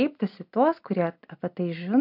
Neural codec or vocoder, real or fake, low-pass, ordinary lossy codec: none; real; 5.4 kHz; MP3, 48 kbps